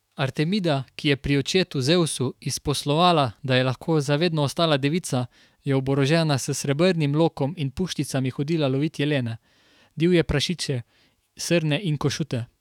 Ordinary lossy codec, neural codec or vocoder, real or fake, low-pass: none; autoencoder, 48 kHz, 128 numbers a frame, DAC-VAE, trained on Japanese speech; fake; 19.8 kHz